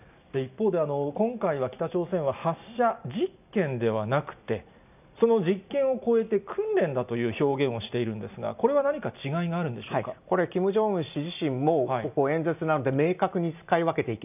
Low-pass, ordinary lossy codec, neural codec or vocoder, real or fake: 3.6 kHz; none; none; real